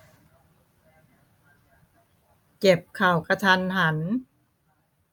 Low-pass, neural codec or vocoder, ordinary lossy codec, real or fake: none; none; none; real